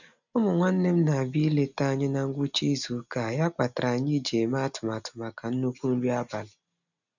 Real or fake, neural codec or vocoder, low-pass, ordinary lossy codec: real; none; 7.2 kHz; none